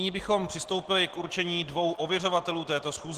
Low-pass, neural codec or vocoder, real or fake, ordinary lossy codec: 14.4 kHz; none; real; Opus, 16 kbps